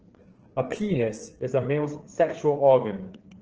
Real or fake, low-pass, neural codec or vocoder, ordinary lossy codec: fake; 7.2 kHz; codec, 16 kHz, 4 kbps, FreqCodec, larger model; Opus, 16 kbps